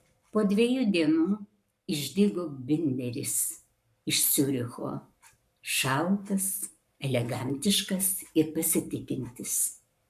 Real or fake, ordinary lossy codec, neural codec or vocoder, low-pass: fake; MP3, 96 kbps; codec, 44.1 kHz, 7.8 kbps, Pupu-Codec; 14.4 kHz